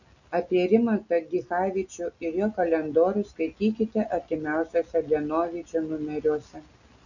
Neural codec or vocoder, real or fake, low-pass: none; real; 7.2 kHz